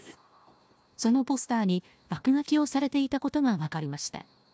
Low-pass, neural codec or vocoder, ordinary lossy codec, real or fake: none; codec, 16 kHz, 1 kbps, FunCodec, trained on Chinese and English, 50 frames a second; none; fake